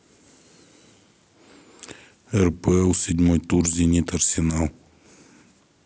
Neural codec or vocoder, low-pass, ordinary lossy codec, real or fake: none; none; none; real